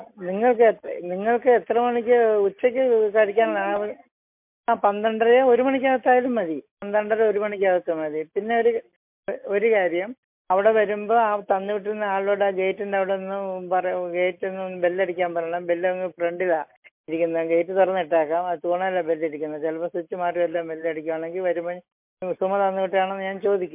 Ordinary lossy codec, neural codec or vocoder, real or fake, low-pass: MP3, 32 kbps; none; real; 3.6 kHz